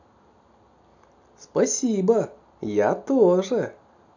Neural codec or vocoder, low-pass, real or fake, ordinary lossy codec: none; 7.2 kHz; real; none